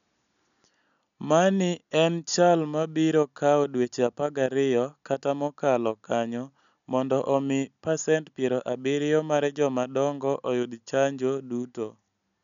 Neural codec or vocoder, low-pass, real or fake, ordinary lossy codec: none; 7.2 kHz; real; none